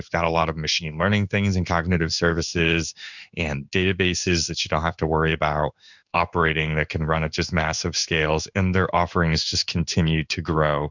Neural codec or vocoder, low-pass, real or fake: codec, 16 kHz in and 24 kHz out, 1 kbps, XY-Tokenizer; 7.2 kHz; fake